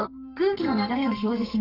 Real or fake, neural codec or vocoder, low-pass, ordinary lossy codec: fake; codec, 32 kHz, 1.9 kbps, SNAC; 5.4 kHz; none